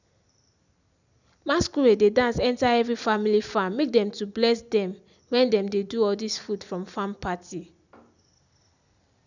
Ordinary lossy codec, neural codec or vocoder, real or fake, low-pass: none; none; real; 7.2 kHz